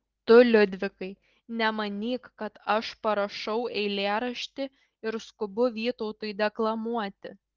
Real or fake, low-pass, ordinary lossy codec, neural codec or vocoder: real; 7.2 kHz; Opus, 16 kbps; none